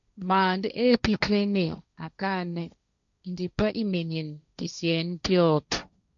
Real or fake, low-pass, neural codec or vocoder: fake; 7.2 kHz; codec, 16 kHz, 1.1 kbps, Voila-Tokenizer